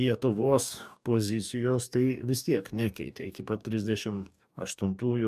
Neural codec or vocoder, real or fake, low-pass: codec, 44.1 kHz, 2.6 kbps, DAC; fake; 14.4 kHz